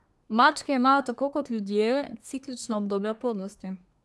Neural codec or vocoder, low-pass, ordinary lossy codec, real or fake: codec, 24 kHz, 1 kbps, SNAC; none; none; fake